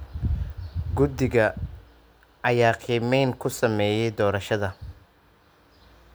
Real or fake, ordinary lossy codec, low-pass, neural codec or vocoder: real; none; none; none